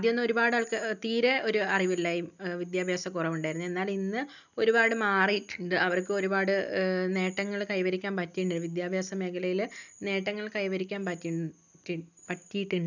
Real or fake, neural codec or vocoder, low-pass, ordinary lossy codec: real; none; 7.2 kHz; none